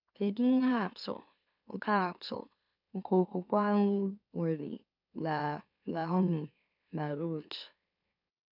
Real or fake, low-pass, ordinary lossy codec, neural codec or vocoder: fake; 5.4 kHz; none; autoencoder, 44.1 kHz, a latent of 192 numbers a frame, MeloTTS